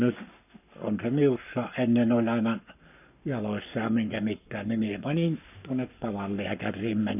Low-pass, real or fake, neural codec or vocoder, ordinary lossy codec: 3.6 kHz; fake; codec, 44.1 kHz, 7.8 kbps, Pupu-Codec; none